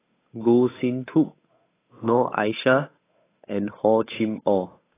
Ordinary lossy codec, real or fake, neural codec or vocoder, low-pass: AAC, 16 kbps; fake; codec, 16 kHz, 8 kbps, FunCodec, trained on Chinese and English, 25 frames a second; 3.6 kHz